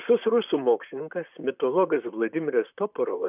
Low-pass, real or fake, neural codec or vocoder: 3.6 kHz; fake; vocoder, 44.1 kHz, 128 mel bands, Pupu-Vocoder